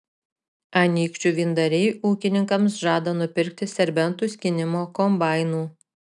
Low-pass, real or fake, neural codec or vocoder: 10.8 kHz; real; none